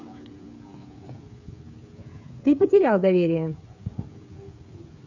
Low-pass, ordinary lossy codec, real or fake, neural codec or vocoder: 7.2 kHz; none; fake; codec, 16 kHz, 8 kbps, FreqCodec, smaller model